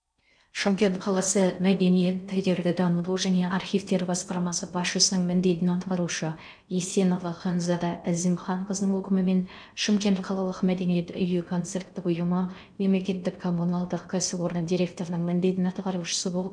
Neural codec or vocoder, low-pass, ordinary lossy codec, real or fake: codec, 16 kHz in and 24 kHz out, 0.6 kbps, FocalCodec, streaming, 4096 codes; 9.9 kHz; none; fake